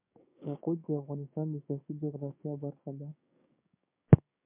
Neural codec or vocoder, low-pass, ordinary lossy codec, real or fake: codec, 16 kHz, 6 kbps, DAC; 3.6 kHz; MP3, 32 kbps; fake